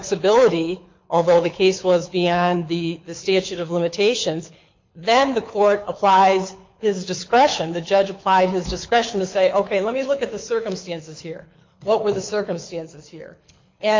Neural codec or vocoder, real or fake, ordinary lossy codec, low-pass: codec, 24 kHz, 6 kbps, HILCodec; fake; MP3, 48 kbps; 7.2 kHz